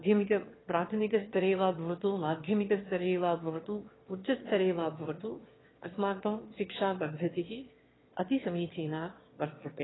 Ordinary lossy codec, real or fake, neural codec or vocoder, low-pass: AAC, 16 kbps; fake; autoencoder, 22.05 kHz, a latent of 192 numbers a frame, VITS, trained on one speaker; 7.2 kHz